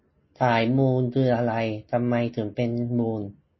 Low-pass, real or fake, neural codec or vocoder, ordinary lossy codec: 7.2 kHz; real; none; MP3, 24 kbps